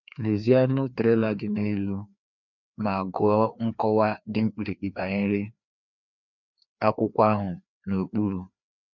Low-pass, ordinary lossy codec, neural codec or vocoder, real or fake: 7.2 kHz; none; codec, 16 kHz, 2 kbps, FreqCodec, larger model; fake